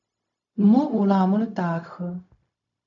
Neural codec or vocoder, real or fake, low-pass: codec, 16 kHz, 0.4 kbps, LongCat-Audio-Codec; fake; 7.2 kHz